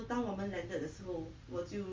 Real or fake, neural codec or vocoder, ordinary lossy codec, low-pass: real; none; Opus, 32 kbps; 7.2 kHz